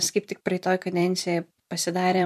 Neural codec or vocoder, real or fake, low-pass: vocoder, 44.1 kHz, 128 mel bands every 256 samples, BigVGAN v2; fake; 14.4 kHz